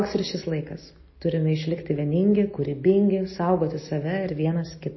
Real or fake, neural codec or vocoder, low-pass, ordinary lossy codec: real; none; 7.2 kHz; MP3, 24 kbps